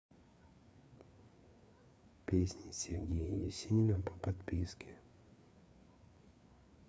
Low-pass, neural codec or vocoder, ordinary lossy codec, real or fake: none; codec, 16 kHz, 4 kbps, FreqCodec, larger model; none; fake